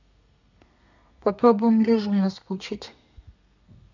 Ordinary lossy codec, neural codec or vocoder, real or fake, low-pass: none; codec, 32 kHz, 1.9 kbps, SNAC; fake; 7.2 kHz